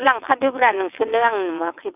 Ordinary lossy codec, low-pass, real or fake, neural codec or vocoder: none; 3.6 kHz; fake; vocoder, 22.05 kHz, 80 mel bands, WaveNeXt